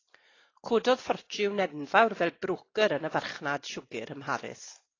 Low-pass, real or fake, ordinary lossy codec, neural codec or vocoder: 7.2 kHz; real; AAC, 32 kbps; none